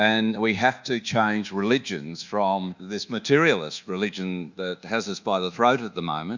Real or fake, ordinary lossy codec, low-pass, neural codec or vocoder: fake; Opus, 64 kbps; 7.2 kHz; codec, 24 kHz, 1.2 kbps, DualCodec